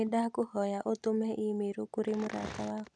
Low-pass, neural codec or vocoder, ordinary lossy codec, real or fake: none; none; none; real